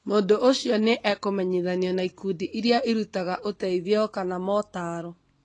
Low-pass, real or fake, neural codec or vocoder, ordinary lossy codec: 10.8 kHz; real; none; AAC, 32 kbps